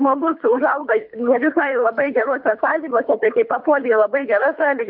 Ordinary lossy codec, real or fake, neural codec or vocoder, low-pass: MP3, 48 kbps; fake; codec, 24 kHz, 3 kbps, HILCodec; 5.4 kHz